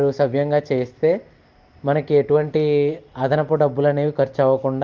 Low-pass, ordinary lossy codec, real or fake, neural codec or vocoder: 7.2 kHz; Opus, 32 kbps; real; none